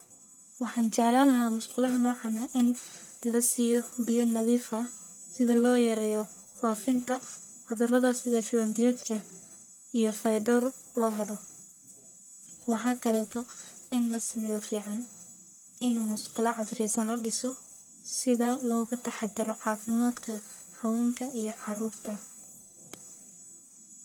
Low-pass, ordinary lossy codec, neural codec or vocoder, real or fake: none; none; codec, 44.1 kHz, 1.7 kbps, Pupu-Codec; fake